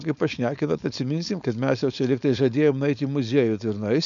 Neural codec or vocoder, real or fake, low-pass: codec, 16 kHz, 4.8 kbps, FACodec; fake; 7.2 kHz